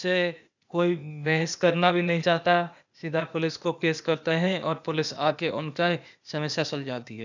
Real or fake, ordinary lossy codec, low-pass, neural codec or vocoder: fake; none; 7.2 kHz; codec, 16 kHz, 0.8 kbps, ZipCodec